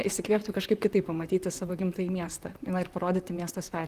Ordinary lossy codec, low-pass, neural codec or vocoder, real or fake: Opus, 24 kbps; 14.4 kHz; vocoder, 44.1 kHz, 128 mel bands, Pupu-Vocoder; fake